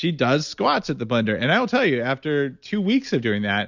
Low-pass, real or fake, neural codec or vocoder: 7.2 kHz; real; none